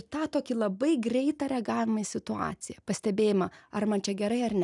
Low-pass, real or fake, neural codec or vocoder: 10.8 kHz; real; none